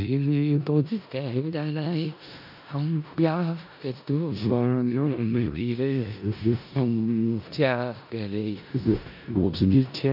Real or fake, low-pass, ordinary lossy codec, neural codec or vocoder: fake; 5.4 kHz; none; codec, 16 kHz in and 24 kHz out, 0.4 kbps, LongCat-Audio-Codec, four codebook decoder